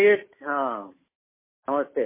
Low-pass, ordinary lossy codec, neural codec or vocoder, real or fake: 3.6 kHz; MP3, 16 kbps; none; real